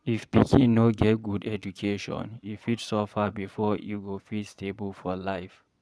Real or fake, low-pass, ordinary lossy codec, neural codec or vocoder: fake; none; none; vocoder, 22.05 kHz, 80 mel bands, Vocos